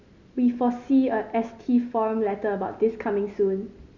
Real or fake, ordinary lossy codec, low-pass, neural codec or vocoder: real; none; 7.2 kHz; none